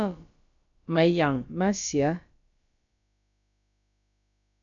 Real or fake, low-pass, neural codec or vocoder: fake; 7.2 kHz; codec, 16 kHz, about 1 kbps, DyCAST, with the encoder's durations